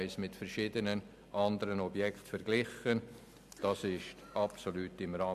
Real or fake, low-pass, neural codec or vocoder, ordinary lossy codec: real; 14.4 kHz; none; none